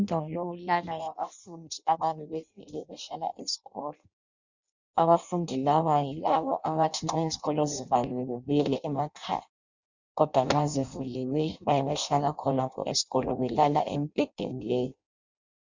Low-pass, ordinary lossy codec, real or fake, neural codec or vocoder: 7.2 kHz; Opus, 64 kbps; fake; codec, 16 kHz in and 24 kHz out, 0.6 kbps, FireRedTTS-2 codec